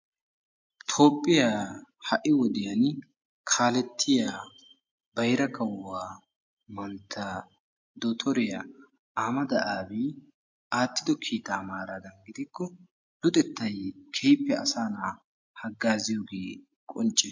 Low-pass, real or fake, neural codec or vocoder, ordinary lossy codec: 7.2 kHz; real; none; MP3, 48 kbps